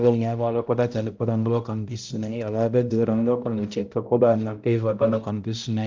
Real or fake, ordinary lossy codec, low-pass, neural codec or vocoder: fake; Opus, 32 kbps; 7.2 kHz; codec, 16 kHz, 0.5 kbps, X-Codec, HuBERT features, trained on balanced general audio